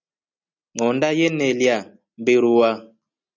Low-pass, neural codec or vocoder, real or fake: 7.2 kHz; none; real